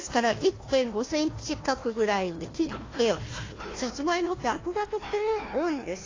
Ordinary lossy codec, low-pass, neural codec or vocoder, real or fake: AAC, 32 kbps; 7.2 kHz; codec, 16 kHz, 1 kbps, FunCodec, trained on Chinese and English, 50 frames a second; fake